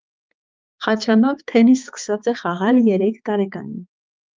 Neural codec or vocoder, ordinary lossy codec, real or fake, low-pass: codec, 16 kHz, 4 kbps, X-Codec, HuBERT features, trained on balanced general audio; Opus, 32 kbps; fake; 7.2 kHz